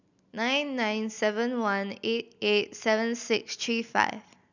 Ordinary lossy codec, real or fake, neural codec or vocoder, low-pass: none; real; none; 7.2 kHz